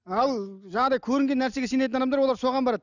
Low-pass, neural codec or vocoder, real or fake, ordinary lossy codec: 7.2 kHz; none; real; none